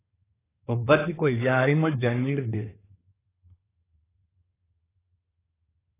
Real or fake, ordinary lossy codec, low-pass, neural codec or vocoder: fake; AAC, 16 kbps; 3.6 kHz; codec, 24 kHz, 1 kbps, SNAC